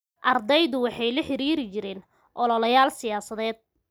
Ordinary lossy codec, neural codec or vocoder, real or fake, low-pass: none; none; real; none